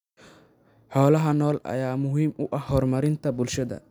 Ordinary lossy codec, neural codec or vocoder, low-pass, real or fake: none; none; 19.8 kHz; real